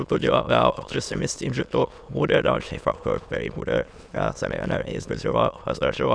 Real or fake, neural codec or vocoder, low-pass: fake; autoencoder, 22.05 kHz, a latent of 192 numbers a frame, VITS, trained on many speakers; 9.9 kHz